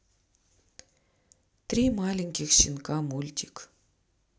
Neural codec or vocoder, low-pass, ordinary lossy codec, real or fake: none; none; none; real